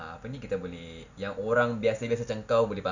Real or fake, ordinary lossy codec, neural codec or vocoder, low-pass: real; none; none; 7.2 kHz